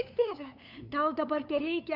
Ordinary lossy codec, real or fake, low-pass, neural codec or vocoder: AAC, 48 kbps; fake; 5.4 kHz; codec, 16 kHz, 8 kbps, FunCodec, trained on LibriTTS, 25 frames a second